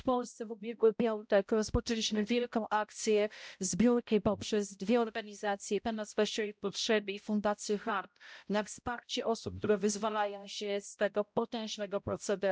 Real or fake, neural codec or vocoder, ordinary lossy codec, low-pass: fake; codec, 16 kHz, 0.5 kbps, X-Codec, HuBERT features, trained on balanced general audio; none; none